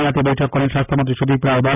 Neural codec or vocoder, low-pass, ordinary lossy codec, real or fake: none; 3.6 kHz; none; real